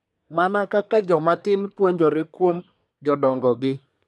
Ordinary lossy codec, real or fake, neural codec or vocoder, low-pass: none; fake; codec, 24 kHz, 1 kbps, SNAC; none